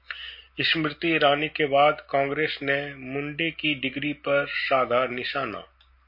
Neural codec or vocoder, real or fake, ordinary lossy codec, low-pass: none; real; MP3, 32 kbps; 5.4 kHz